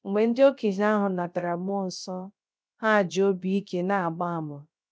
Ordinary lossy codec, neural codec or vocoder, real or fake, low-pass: none; codec, 16 kHz, 0.3 kbps, FocalCodec; fake; none